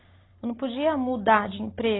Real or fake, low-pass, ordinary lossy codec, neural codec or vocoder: real; 7.2 kHz; AAC, 16 kbps; none